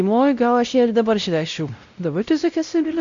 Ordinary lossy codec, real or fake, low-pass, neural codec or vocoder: MP3, 64 kbps; fake; 7.2 kHz; codec, 16 kHz, 0.5 kbps, X-Codec, WavLM features, trained on Multilingual LibriSpeech